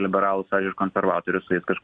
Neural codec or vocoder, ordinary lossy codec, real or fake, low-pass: none; MP3, 64 kbps; real; 9.9 kHz